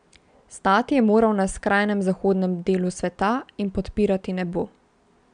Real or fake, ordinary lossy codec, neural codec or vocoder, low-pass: real; none; none; 9.9 kHz